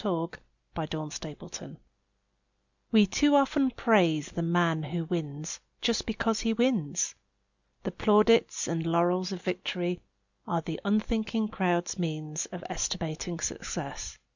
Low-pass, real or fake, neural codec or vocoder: 7.2 kHz; real; none